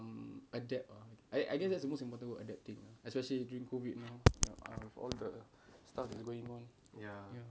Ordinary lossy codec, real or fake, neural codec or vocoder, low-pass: none; real; none; none